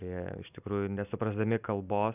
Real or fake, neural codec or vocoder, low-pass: real; none; 3.6 kHz